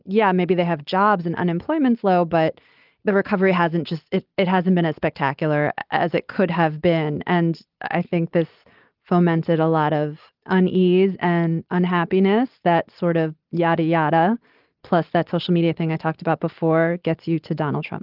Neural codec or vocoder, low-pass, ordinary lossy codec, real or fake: none; 5.4 kHz; Opus, 32 kbps; real